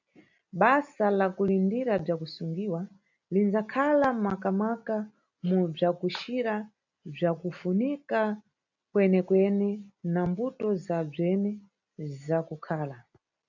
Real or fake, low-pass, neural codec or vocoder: real; 7.2 kHz; none